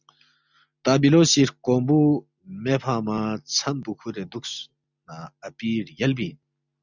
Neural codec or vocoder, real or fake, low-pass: none; real; 7.2 kHz